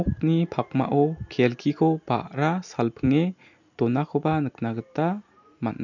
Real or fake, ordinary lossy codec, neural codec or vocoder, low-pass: real; none; none; 7.2 kHz